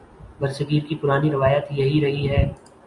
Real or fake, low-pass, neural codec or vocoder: real; 10.8 kHz; none